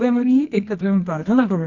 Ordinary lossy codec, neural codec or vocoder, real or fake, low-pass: none; codec, 24 kHz, 0.9 kbps, WavTokenizer, medium music audio release; fake; 7.2 kHz